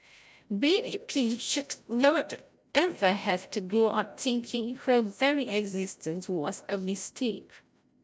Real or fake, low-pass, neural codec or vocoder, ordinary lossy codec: fake; none; codec, 16 kHz, 0.5 kbps, FreqCodec, larger model; none